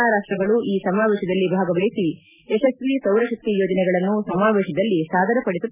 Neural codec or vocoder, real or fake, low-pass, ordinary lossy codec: none; real; 3.6 kHz; none